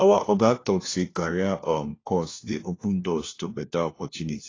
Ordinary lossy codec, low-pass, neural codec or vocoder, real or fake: AAC, 32 kbps; 7.2 kHz; codec, 16 kHz, 1 kbps, FunCodec, trained on LibriTTS, 50 frames a second; fake